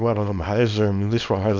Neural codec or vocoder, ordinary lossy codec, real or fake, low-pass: codec, 24 kHz, 0.9 kbps, WavTokenizer, small release; MP3, 48 kbps; fake; 7.2 kHz